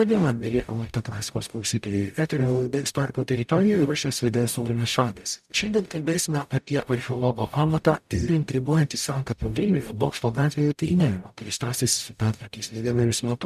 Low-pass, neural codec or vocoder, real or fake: 14.4 kHz; codec, 44.1 kHz, 0.9 kbps, DAC; fake